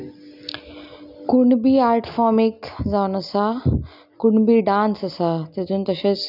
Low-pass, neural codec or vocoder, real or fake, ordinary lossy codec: 5.4 kHz; none; real; none